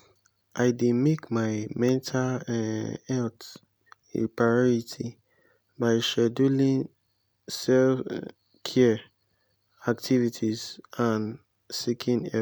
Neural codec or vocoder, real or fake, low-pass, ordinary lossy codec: none; real; none; none